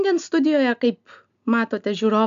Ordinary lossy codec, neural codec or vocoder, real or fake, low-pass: MP3, 64 kbps; none; real; 7.2 kHz